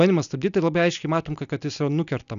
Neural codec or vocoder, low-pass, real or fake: none; 7.2 kHz; real